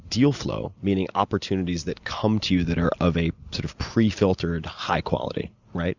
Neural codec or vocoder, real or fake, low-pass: none; real; 7.2 kHz